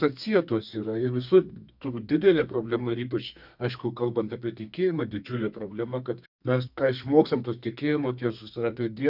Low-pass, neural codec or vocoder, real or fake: 5.4 kHz; codec, 32 kHz, 1.9 kbps, SNAC; fake